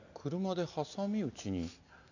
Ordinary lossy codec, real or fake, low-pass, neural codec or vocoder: none; real; 7.2 kHz; none